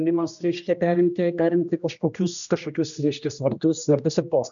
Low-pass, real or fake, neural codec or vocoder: 7.2 kHz; fake; codec, 16 kHz, 1 kbps, X-Codec, HuBERT features, trained on general audio